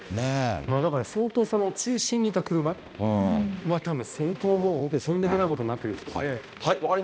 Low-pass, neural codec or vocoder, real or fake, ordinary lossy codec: none; codec, 16 kHz, 1 kbps, X-Codec, HuBERT features, trained on balanced general audio; fake; none